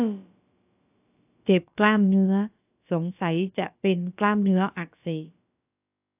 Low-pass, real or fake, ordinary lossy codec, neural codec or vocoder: 3.6 kHz; fake; AAC, 32 kbps; codec, 16 kHz, about 1 kbps, DyCAST, with the encoder's durations